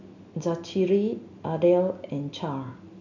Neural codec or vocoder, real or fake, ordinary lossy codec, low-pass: none; real; none; 7.2 kHz